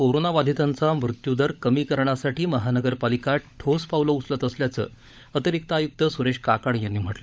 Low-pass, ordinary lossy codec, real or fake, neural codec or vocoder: none; none; fake; codec, 16 kHz, 16 kbps, FunCodec, trained on LibriTTS, 50 frames a second